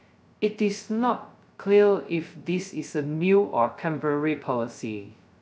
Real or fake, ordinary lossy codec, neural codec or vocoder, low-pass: fake; none; codec, 16 kHz, 0.2 kbps, FocalCodec; none